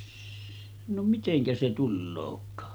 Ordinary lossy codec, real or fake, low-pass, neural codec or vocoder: none; real; none; none